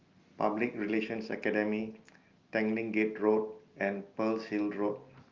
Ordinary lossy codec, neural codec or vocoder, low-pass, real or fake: Opus, 32 kbps; none; 7.2 kHz; real